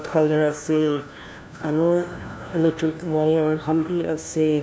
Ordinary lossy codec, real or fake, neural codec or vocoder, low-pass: none; fake; codec, 16 kHz, 1 kbps, FunCodec, trained on LibriTTS, 50 frames a second; none